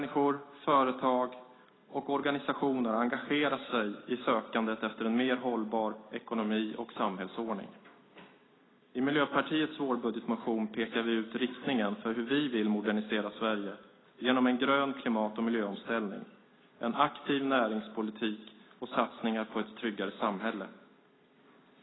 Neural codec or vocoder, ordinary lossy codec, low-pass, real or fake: none; AAC, 16 kbps; 7.2 kHz; real